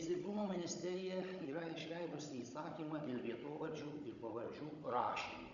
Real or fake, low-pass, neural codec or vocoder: fake; 7.2 kHz; codec, 16 kHz, 16 kbps, FunCodec, trained on Chinese and English, 50 frames a second